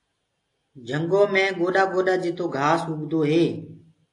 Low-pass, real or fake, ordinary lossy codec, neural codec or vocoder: 10.8 kHz; real; AAC, 48 kbps; none